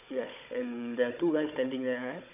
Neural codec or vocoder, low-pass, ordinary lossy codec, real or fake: codec, 16 kHz, 16 kbps, FunCodec, trained on Chinese and English, 50 frames a second; 3.6 kHz; none; fake